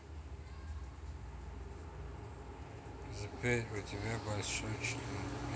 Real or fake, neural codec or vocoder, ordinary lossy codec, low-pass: real; none; none; none